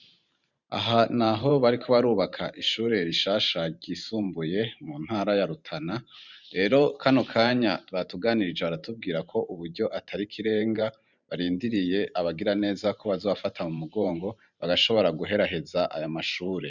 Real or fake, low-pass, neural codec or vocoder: fake; 7.2 kHz; vocoder, 44.1 kHz, 128 mel bands every 512 samples, BigVGAN v2